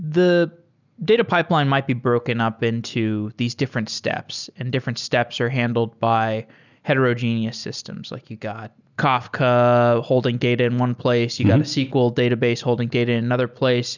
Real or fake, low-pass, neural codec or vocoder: real; 7.2 kHz; none